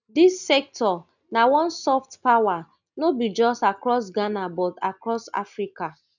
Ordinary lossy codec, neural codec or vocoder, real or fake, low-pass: none; none; real; 7.2 kHz